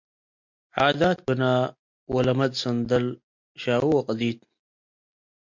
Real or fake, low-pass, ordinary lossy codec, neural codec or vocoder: real; 7.2 kHz; MP3, 48 kbps; none